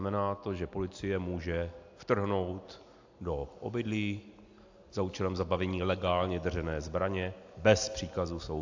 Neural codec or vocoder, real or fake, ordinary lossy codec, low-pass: none; real; AAC, 48 kbps; 7.2 kHz